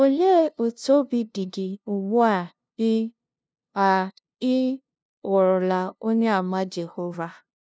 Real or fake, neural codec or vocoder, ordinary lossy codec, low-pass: fake; codec, 16 kHz, 0.5 kbps, FunCodec, trained on LibriTTS, 25 frames a second; none; none